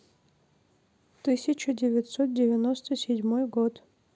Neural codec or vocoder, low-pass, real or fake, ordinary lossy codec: none; none; real; none